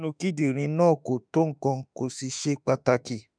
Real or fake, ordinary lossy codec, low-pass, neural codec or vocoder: fake; none; 9.9 kHz; autoencoder, 48 kHz, 32 numbers a frame, DAC-VAE, trained on Japanese speech